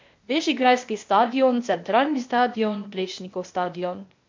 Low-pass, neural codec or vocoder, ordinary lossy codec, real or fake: 7.2 kHz; codec, 16 kHz, 0.8 kbps, ZipCodec; MP3, 48 kbps; fake